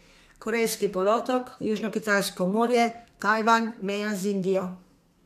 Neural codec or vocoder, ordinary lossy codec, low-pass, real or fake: codec, 32 kHz, 1.9 kbps, SNAC; none; 14.4 kHz; fake